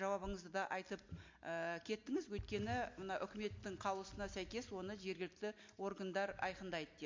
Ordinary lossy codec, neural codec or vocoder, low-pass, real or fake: MP3, 48 kbps; none; 7.2 kHz; real